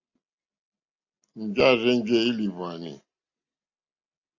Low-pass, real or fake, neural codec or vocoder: 7.2 kHz; real; none